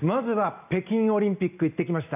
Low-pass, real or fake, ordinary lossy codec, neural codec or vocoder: 3.6 kHz; real; none; none